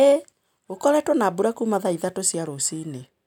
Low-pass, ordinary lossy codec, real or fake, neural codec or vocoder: 19.8 kHz; none; real; none